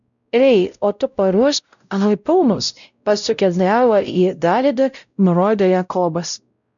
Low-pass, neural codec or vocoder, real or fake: 7.2 kHz; codec, 16 kHz, 0.5 kbps, X-Codec, WavLM features, trained on Multilingual LibriSpeech; fake